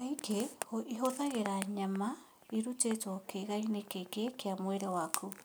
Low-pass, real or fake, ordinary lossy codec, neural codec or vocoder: none; real; none; none